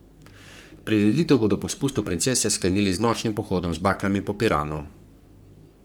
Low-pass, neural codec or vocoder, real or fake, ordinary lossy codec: none; codec, 44.1 kHz, 3.4 kbps, Pupu-Codec; fake; none